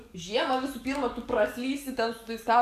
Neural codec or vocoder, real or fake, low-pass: codec, 44.1 kHz, 7.8 kbps, DAC; fake; 14.4 kHz